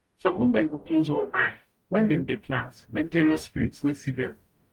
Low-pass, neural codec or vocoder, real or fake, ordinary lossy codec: 19.8 kHz; codec, 44.1 kHz, 0.9 kbps, DAC; fake; Opus, 32 kbps